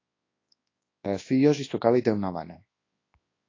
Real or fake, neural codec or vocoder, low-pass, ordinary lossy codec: fake; codec, 24 kHz, 0.9 kbps, WavTokenizer, large speech release; 7.2 kHz; AAC, 32 kbps